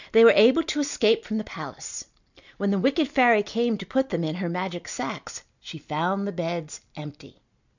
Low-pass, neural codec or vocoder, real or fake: 7.2 kHz; none; real